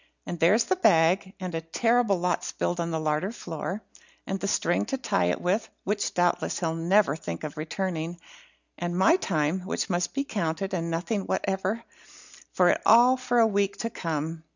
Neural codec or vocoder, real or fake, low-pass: none; real; 7.2 kHz